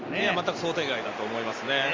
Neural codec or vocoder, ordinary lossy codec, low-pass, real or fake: none; Opus, 32 kbps; 7.2 kHz; real